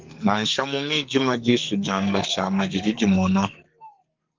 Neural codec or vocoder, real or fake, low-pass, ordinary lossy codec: codec, 44.1 kHz, 2.6 kbps, SNAC; fake; 7.2 kHz; Opus, 24 kbps